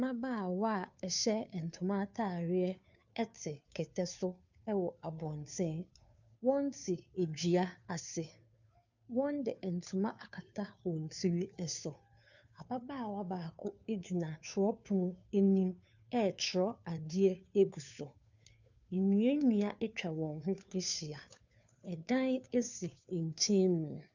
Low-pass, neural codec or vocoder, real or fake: 7.2 kHz; codec, 16 kHz, 2 kbps, FunCodec, trained on Chinese and English, 25 frames a second; fake